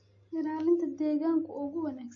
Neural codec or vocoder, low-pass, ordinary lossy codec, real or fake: none; 7.2 kHz; MP3, 32 kbps; real